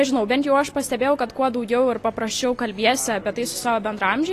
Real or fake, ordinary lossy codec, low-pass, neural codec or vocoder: real; AAC, 48 kbps; 14.4 kHz; none